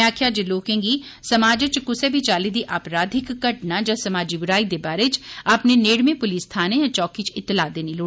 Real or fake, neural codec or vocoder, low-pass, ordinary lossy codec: real; none; none; none